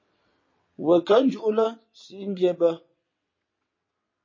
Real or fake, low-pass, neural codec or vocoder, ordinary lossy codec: fake; 7.2 kHz; vocoder, 22.05 kHz, 80 mel bands, WaveNeXt; MP3, 32 kbps